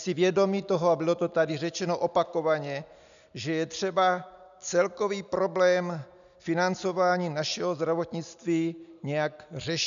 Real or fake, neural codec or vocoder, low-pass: real; none; 7.2 kHz